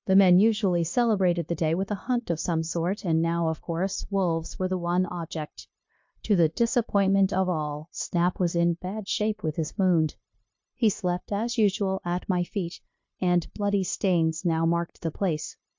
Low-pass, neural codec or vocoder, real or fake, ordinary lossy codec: 7.2 kHz; codec, 16 kHz, 0.9 kbps, LongCat-Audio-Codec; fake; MP3, 48 kbps